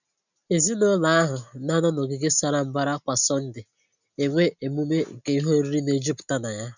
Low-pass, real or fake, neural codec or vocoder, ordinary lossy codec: 7.2 kHz; real; none; none